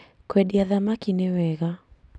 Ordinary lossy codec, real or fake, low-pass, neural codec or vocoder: none; real; none; none